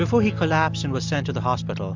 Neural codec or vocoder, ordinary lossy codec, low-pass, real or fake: none; MP3, 64 kbps; 7.2 kHz; real